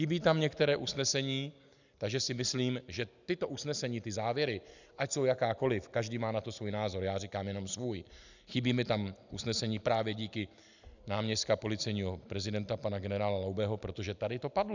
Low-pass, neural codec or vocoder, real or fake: 7.2 kHz; none; real